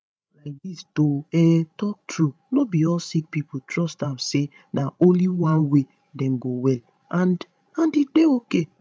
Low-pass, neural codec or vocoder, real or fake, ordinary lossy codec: none; codec, 16 kHz, 16 kbps, FreqCodec, larger model; fake; none